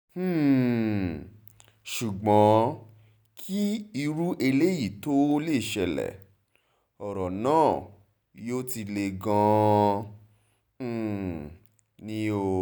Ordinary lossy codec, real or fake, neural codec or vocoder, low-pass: none; real; none; none